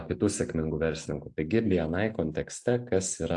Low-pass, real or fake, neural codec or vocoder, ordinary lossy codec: 10.8 kHz; real; none; AAC, 64 kbps